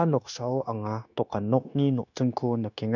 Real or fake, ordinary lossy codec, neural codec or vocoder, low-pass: fake; none; codec, 16 kHz, 0.9 kbps, LongCat-Audio-Codec; 7.2 kHz